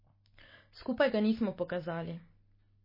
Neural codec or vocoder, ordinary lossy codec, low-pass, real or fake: none; MP3, 24 kbps; 5.4 kHz; real